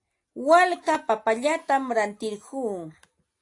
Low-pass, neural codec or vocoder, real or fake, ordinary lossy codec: 10.8 kHz; none; real; AAC, 48 kbps